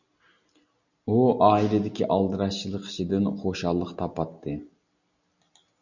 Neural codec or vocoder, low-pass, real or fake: none; 7.2 kHz; real